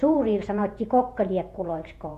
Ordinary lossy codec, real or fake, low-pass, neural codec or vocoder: AAC, 64 kbps; fake; 14.4 kHz; vocoder, 48 kHz, 128 mel bands, Vocos